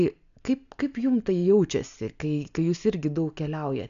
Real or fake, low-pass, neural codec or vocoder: real; 7.2 kHz; none